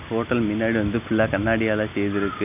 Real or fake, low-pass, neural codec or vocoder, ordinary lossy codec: real; 3.6 kHz; none; none